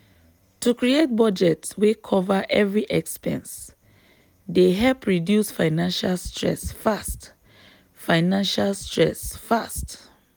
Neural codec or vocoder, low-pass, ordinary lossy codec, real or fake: none; none; none; real